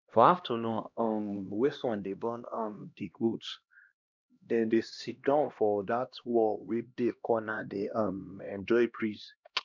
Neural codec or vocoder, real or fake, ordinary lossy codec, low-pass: codec, 16 kHz, 1 kbps, X-Codec, HuBERT features, trained on LibriSpeech; fake; none; 7.2 kHz